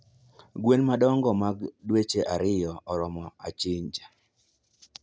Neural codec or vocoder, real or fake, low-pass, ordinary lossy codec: none; real; none; none